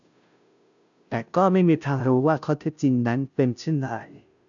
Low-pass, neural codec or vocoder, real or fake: 7.2 kHz; codec, 16 kHz, 0.5 kbps, FunCodec, trained on Chinese and English, 25 frames a second; fake